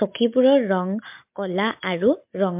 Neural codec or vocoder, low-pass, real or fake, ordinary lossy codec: none; 3.6 kHz; real; MP3, 32 kbps